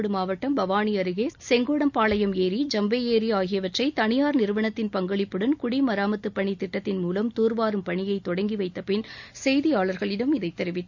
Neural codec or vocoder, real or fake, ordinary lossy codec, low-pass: none; real; none; 7.2 kHz